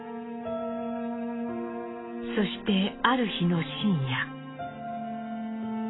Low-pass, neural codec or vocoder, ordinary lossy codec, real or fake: 7.2 kHz; none; AAC, 16 kbps; real